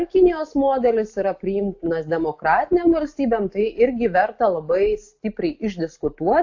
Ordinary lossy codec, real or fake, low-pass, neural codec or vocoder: AAC, 48 kbps; real; 7.2 kHz; none